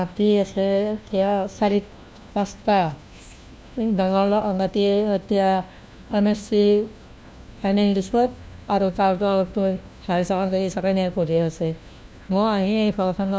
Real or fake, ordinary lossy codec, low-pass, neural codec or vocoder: fake; none; none; codec, 16 kHz, 1 kbps, FunCodec, trained on LibriTTS, 50 frames a second